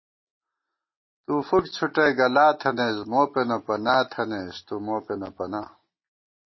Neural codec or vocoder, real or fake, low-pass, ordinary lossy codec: none; real; 7.2 kHz; MP3, 24 kbps